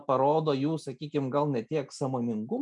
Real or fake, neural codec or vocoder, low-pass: real; none; 10.8 kHz